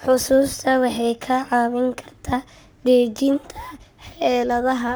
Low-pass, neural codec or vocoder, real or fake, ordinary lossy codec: none; codec, 44.1 kHz, 7.8 kbps, Pupu-Codec; fake; none